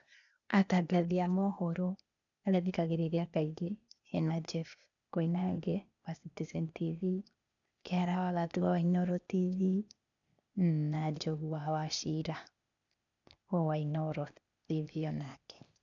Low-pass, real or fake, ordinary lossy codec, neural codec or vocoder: 7.2 kHz; fake; none; codec, 16 kHz, 0.8 kbps, ZipCodec